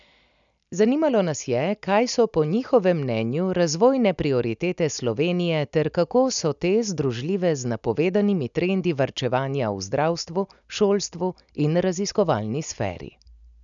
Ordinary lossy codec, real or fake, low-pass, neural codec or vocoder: none; real; 7.2 kHz; none